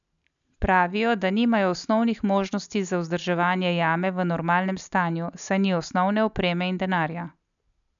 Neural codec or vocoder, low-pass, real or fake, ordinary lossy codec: none; 7.2 kHz; real; MP3, 96 kbps